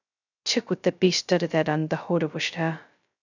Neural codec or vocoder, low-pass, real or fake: codec, 16 kHz, 0.2 kbps, FocalCodec; 7.2 kHz; fake